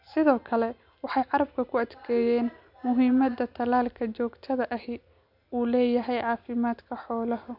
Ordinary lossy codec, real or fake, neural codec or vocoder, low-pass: none; real; none; 5.4 kHz